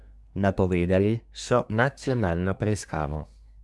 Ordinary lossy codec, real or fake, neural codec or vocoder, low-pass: none; fake; codec, 24 kHz, 1 kbps, SNAC; none